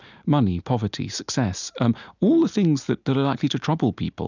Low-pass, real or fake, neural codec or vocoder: 7.2 kHz; real; none